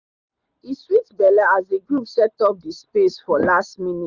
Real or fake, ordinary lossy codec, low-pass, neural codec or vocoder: real; Opus, 64 kbps; 7.2 kHz; none